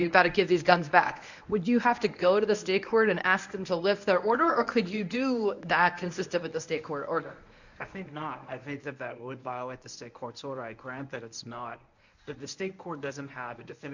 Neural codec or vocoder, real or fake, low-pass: codec, 24 kHz, 0.9 kbps, WavTokenizer, medium speech release version 1; fake; 7.2 kHz